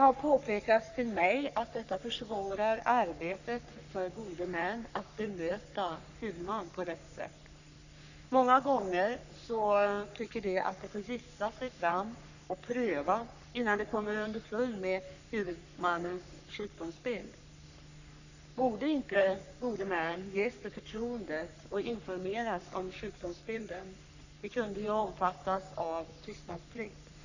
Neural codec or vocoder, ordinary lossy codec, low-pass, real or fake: codec, 44.1 kHz, 3.4 kbps, Pupu-Codec; none; 7.2 kHz; fake